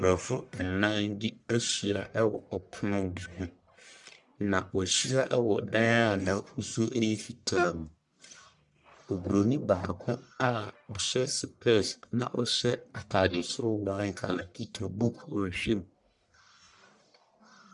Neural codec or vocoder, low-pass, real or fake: codec, 44.1 kHz, 1.7 kbps, Pupu-Codec; 10.8 kHz; fake